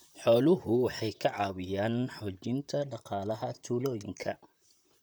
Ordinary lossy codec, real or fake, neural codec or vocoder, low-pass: none; fake; vocoder, 44.1 kHz, 128 mel bands, Pupu-Vocoder; none